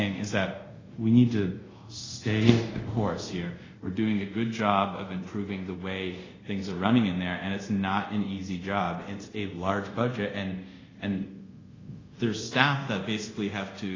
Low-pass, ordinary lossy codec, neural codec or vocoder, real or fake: 7.2 kHz; AAC, 32 kbps; codec, 24 kHz, 0.5 kbps, DualCodec; fake